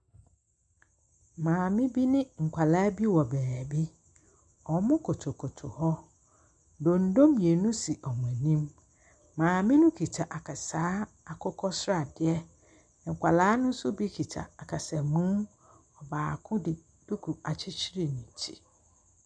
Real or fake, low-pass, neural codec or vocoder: real; 9.9 kHz; none